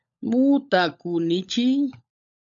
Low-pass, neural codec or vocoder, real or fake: 7.2 kHz; codec, 16 kHz, 16 kbps, FunCodec, trained on LibriTTS, 50 frames a second; fake